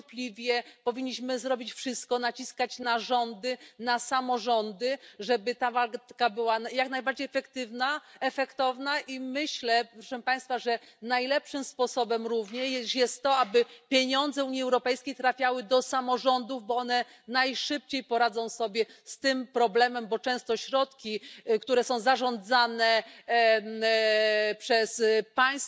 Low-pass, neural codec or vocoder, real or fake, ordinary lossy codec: none; none; real; none